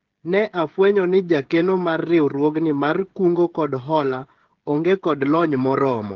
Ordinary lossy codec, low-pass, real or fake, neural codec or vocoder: Opus, 16 kbps; 7.2 kHz; fake; codec, 16 kHz, 16 kbps, FreqCodec, smaller model